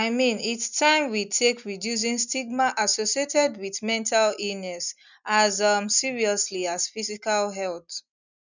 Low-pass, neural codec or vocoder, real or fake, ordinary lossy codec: 7.2 kHz; none; real; none